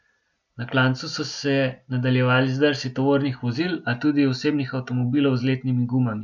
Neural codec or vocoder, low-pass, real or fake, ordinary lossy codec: none; 7.2 kHz; real; none